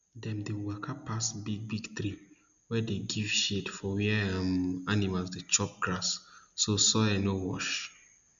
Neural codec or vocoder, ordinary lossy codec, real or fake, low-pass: none; none; real; 7.2 kHz